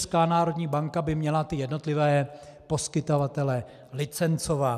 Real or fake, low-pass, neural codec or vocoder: real; 14.4 kHz; none